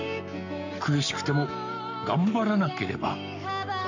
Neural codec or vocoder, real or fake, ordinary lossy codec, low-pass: codec, 44.1 kHz, 7.8 kbps, Pupu-Codec; fake; none; 7.2 kHz